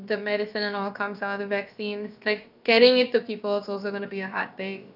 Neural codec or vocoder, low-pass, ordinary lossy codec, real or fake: codec, 16 kHz, about 1 kbps, DyCAST, with the encoder's durations; 5.4 kHz; none; fake